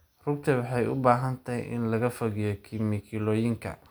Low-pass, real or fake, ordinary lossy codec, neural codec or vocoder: none; real; none; none